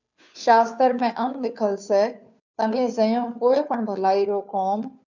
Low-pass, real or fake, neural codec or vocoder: 7.2 kHz; fake; codec, 16 kHz, 2 kbps, FunCodec, trained on Chinese and English, 25 frames a second